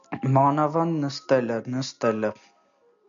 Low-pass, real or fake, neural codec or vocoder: 7.2 kHz; real; none